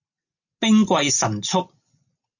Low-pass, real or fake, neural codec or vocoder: 7.2 kHz; real; none